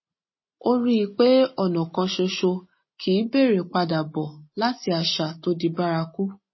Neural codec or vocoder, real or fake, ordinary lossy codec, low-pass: none; real; MP3, 24 kbps; 7.2 kHz